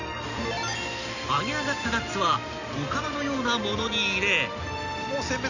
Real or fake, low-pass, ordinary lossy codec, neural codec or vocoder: real; 7.2 kHz; none; none